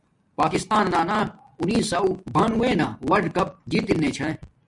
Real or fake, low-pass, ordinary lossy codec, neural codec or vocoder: real; 10.8 kHz; MP3, 64 kbps; none